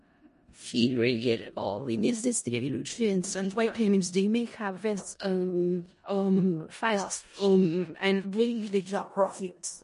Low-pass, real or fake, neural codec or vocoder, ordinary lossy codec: 10.8 kHz; fake; codec, 16 kHz in and 24 kHz out, 0.4 kbps, LongCat-Audio-Codec, four codebook decoder; MP3, 48 kbps